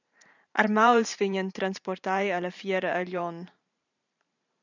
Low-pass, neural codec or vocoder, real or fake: 7.2 kHz; vocoder, 44.1 kHz, 128 mel bands every 512 samples, BigVGAN v2; fake